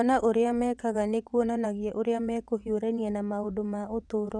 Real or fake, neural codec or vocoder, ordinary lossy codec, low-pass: fake; vocoder, 22.05 kHz, 80 mel bands, WaveNeXt; none; 9.9 kHz